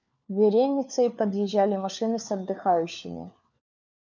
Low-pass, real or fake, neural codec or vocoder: 7.2 kHz; fake; codec, 16 kHz, 4 kbps, FunCodec, trained on LibriTTS, 50 frames a second